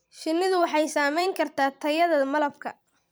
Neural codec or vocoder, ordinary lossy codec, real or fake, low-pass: vocoder, 44.1 kHz, 128 mel bands every 256 samples, BigVGAN v2; none; fake; none